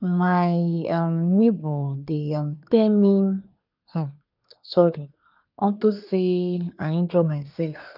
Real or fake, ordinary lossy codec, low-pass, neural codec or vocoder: fake; none; 5.4 kHz; codec, 24 kHz, 1 kbps, SNAC